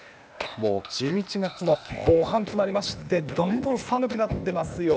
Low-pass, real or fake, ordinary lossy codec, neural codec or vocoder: none; fake; none; codec, 16 kHz, 0.8 kbps, ZipCodec